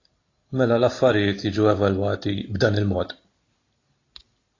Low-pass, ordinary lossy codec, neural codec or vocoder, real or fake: 7.2 kHz; AAC, 32 kbps; none; real